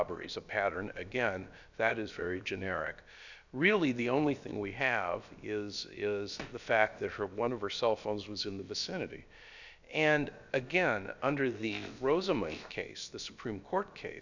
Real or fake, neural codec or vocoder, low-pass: fake; codec, 16 kHz, about 1 kbps, DyCAST, with the encoder's durations; 7.2 kHz